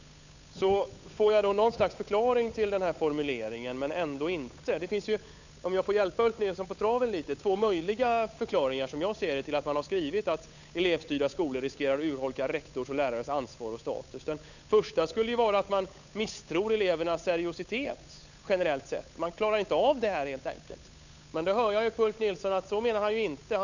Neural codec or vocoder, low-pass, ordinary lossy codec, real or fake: codec, 16 kHz, 8 kbps, FunCodec, trained on Chinese and English, 25 frames a second; 7.2 kHz; none; fake